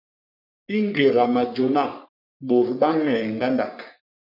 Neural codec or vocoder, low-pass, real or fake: codec, 44.1 kHz, 3.4 kbps, Pupu-Codec; 5.4 kHz; fake